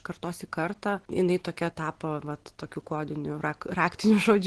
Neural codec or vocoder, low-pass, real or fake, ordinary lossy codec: none; 10.8 kHz; real; Opus, 16 kbps